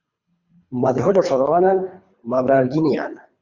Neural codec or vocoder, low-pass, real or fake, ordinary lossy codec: codec, 24 kHz, 3 kbps, HILCodec; 7.2 kHz; fake; Opus, 64 kbps